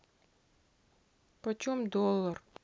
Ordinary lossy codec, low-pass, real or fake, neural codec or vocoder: none; none; real; none